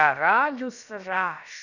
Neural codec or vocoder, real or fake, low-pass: codec, 16 kHz, about 1 kbps, DyCAST, with the encoder's durations; fake; 7.2 kHz